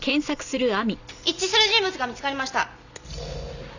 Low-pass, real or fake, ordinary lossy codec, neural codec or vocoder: 7.2 kHz; real; none; none